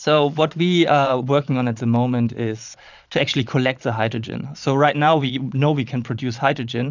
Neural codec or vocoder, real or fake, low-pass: vocoder, 22.05 kHz, 80 mel bands, Vocos; fake; 7.2 kHz